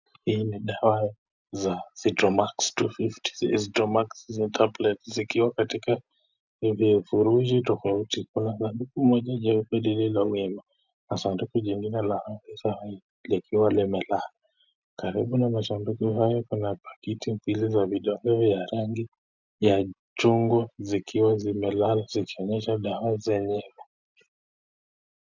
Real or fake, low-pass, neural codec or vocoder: real; 7.2 kHz; none